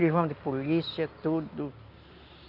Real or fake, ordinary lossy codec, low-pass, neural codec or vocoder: real; none; 5.4 kHz; none